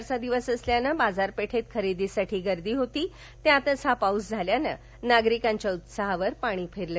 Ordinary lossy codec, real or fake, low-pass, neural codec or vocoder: none; real; none; none